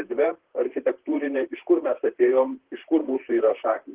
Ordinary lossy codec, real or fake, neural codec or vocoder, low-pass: Opus, 16 kbps; fake; vocoder, 44.1 kHz, 128 mel bands, Pupu-Vocoder; 3.6 kHz